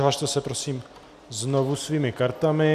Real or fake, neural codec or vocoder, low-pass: real; none; 14.4 kHz